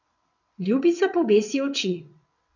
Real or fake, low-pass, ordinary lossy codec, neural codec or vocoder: fake; 7.2 kHz; none; vocoder, 22.05 kHz, 80 mel bands, WaveNeXt